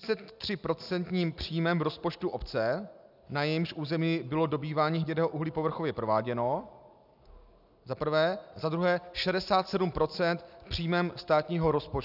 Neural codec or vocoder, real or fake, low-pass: none; real; 5.4 kHz